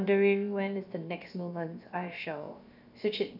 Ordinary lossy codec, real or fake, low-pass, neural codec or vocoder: none; fake; 5.4 kHz; codec, 16 kHz, about 1 kbps, DyCAST, with the encoder's durations